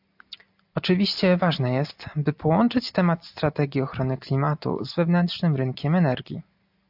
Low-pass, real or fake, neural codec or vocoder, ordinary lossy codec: 5.4 kHz; real; none; AAC, 48 kbps